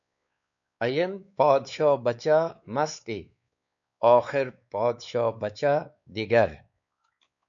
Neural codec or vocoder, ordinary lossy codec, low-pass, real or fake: codec, 16 kHz, 4 kbps, X-Codec, WavLM features, trained on Multilingual LibriSpeech; MP3, 96 kbps; 7.2 kHz; fake